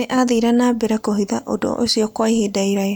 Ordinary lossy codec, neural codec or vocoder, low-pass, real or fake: none; none; none; real